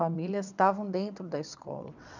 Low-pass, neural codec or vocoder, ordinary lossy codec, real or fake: 7.2 kHz; vocoder, 22.05 kHz, 80 mel bands, WaveNeXt; none; fake